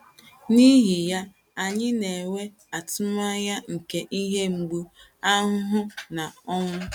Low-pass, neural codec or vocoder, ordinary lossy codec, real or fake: 19.8 kHz; none; none; real